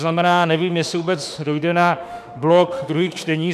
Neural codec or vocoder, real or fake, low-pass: autoencoder, 48 kHz, 32 numbers a frame, DAC-VAE, trained on Japanese speech; fake; 14.4 kHz